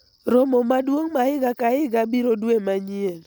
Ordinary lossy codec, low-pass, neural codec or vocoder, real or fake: none; none; none; real